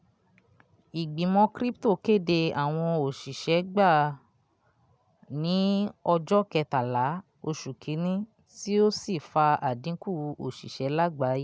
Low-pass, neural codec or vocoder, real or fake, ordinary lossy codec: none; none; real; none